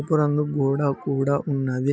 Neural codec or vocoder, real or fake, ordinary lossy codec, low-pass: none; real; none; none